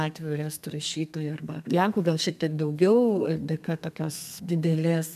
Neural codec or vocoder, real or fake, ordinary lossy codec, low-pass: codec, 32 kHz, 1.9 kbps, SNAC; fake; MP3, 96 kbps; 14.4 kHz